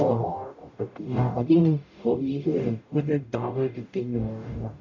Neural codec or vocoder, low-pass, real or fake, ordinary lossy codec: codec, 44.1 kHz, 0.9 kbps, DAC; 7.2 kHz; fake; none